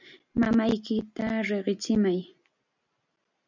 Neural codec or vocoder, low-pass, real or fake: none; 7.2 kHz; real